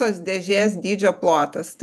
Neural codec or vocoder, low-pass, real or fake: vocoder, 48 kHz, 128 mel bands, Vocos; 14.4 kHz; fake